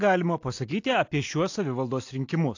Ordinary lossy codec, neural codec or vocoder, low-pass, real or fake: AAC, 48 kbps; none; 7.2 kHz; real